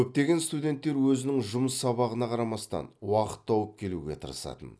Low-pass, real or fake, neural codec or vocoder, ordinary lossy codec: none; real; none; none